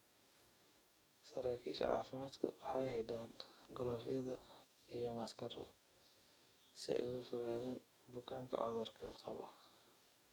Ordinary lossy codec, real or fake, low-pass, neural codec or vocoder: none; fake; none; codec, 44.1 kHz, 2.6 kbps, DAC